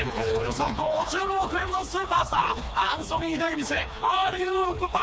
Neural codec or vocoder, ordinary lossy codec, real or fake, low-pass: codec, 16 kHz, 2 kbps, FreqCodec, smaller model; none; fake; none